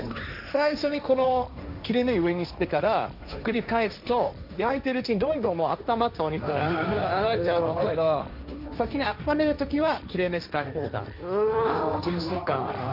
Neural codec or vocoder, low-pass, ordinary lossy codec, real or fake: codec, 16 kHz, 1.1 kbps, Voila-Tokenizer; 5.4 kHz; none; fake